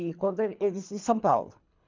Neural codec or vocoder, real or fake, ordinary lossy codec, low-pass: codec, 24 kHz, 3 kbps, HILCodec; fake; MP3, 64 kbps; 7.2 kHz